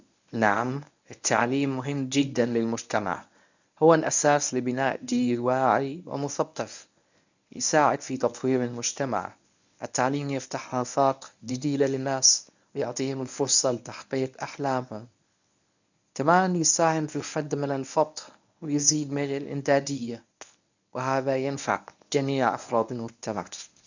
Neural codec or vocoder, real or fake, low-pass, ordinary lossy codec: codec, 24 kHz, 0.9 kbps, WavTokenizer, medium speech release version 1; fake; 7.2 kHz; none